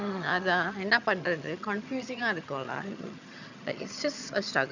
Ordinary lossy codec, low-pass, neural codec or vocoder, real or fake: none; 7.2 kHz; vocoder, 22.05 kHz, 80 mel bands, HiFi-GAN; fake